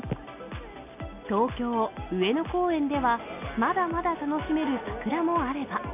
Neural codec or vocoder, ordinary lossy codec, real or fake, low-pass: none; none; real; 3.6 kHz